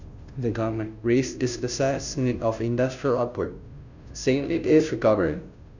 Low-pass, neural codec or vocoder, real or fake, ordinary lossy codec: 7.2 kHz; codec, 16 kHz, 0.5 kbps, FunCodec, trained on Chinese and English, 25 frames a second; fake; none